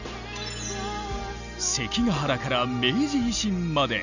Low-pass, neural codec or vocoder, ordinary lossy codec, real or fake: 7.2 kHz; none; none; real